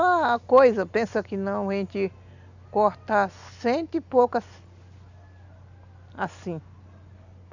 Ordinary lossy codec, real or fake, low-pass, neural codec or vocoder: none; real; 7.2 kHz; none